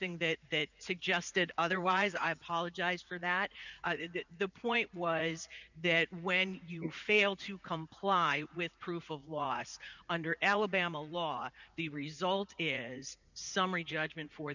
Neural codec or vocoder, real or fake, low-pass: vocoder, 44.1 kHz, 80 mel bands, Vocos; fake; 7.2 kHz